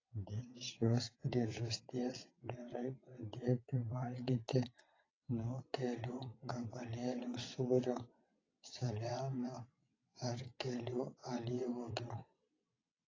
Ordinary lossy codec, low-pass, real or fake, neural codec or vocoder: AAC, 32 kbps; 7.2 kHz; fake; vocoder, 22.05 kHz, 80 mel bands, WaveNeXt